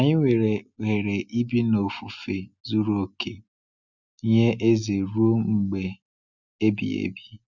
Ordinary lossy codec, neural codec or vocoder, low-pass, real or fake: none; none; 7.2 kHz; real